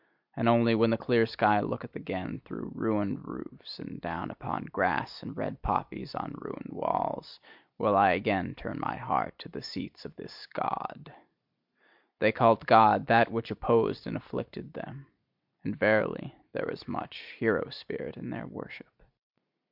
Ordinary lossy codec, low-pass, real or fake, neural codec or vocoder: MP3, 48 kbps; 5.4 kHz; real; none